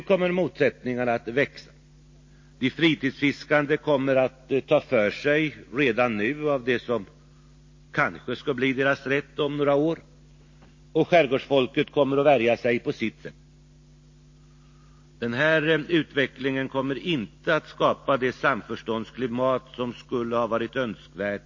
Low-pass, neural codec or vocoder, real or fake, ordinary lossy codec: 7.2 kHz; none; real; MP3, 32 kbps